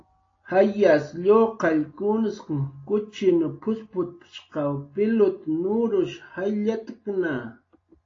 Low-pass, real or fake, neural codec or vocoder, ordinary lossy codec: 7.2 kHz; real; none; AAC, 32 kbps